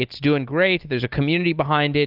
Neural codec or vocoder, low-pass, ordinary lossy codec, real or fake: none; 5.4 kHz; Opus, 32 kbps; real